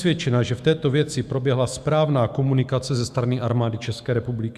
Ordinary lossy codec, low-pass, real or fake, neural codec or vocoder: Opus, 64 kbps; 14.4 kHz; fake; autoencoder, 48 kHz, 128 numbers a frame, DAC-VAE, trained on Japanese speech